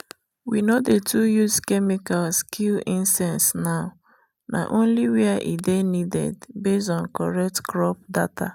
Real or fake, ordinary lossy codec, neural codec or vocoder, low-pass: real; none; none; 19.8 kHz